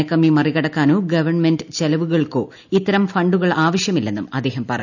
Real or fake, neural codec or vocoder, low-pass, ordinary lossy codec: real; none; 7.2 kHz; none